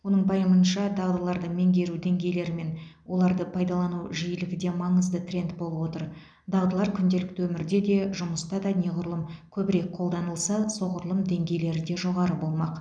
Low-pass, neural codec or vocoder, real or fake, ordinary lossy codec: 9.9 kHz; none; real; none